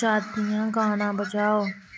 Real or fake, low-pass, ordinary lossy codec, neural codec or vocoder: real; none; none; none